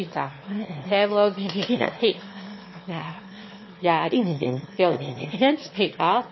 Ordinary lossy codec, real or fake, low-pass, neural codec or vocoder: MP3, 24 kbps; fake; 7.2 kHz; autoencoder, 22.05 kHz, a latent of 192 numbers a frame, VITS, trained on one speaker